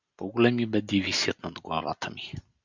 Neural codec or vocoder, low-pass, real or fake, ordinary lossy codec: none; 7.2 kHz; real; Opus, 64 kbps